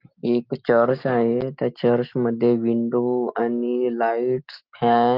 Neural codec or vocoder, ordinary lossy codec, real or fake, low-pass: none; Opus, 32 kbps; real; 5.4 kHz